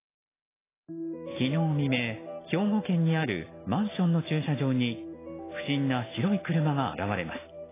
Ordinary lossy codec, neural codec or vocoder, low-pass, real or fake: AAC, 16 kbps; none; 3.6 kHz; real